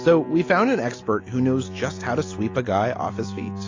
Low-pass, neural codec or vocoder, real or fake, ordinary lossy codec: 7.2 kHz; none; real; AAC, 32 kbps